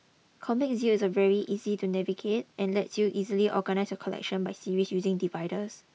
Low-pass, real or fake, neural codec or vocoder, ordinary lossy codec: none; real; none; none